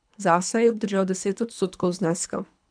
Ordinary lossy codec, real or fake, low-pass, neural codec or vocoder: none; fake; 9.9 kHz; codec, 24 kHz, 3 kbps, HILCodec